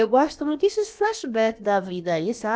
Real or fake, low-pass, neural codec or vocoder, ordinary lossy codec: fake; none; codec, 16 kHz, about 1 kbps, DyCAST, with the encoder's durations; none